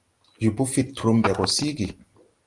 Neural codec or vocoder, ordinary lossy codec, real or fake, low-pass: none; Opus, 24 kbps; real; 10.8 kHz